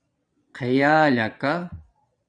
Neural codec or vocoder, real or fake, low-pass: vocoder, 22.05 kHz, 80 mel bands, Vocos; fake; 9.9 kHz